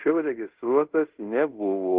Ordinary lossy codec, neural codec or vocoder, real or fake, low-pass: Opus, 16 kbps; codec, 24 kHz, 0.5 kbps, DualCodec; fake; 3.6 kHz